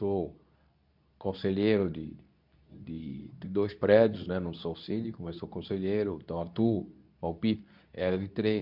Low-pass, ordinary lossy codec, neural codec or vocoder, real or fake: 5.4 kHz; none; codec, 24 kHz, 0.9 kbps, WavTokenizer, medium speech release version 2; fake